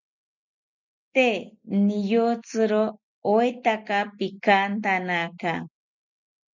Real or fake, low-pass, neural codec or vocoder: real; 7.2 kHz; none